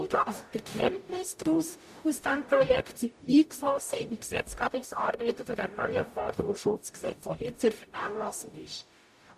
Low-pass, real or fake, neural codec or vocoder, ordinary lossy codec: 14.4 kHz; fake; codec, 44.1 kHz, 0.9 kbps, DAC; MP3, 96 kbps